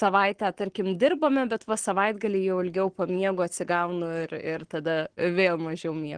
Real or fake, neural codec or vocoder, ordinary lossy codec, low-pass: fake; codec, 44.1 kHz, 7.8 kbps, Pupu-Codec; Opus, 16 kbps; 9.9 kHz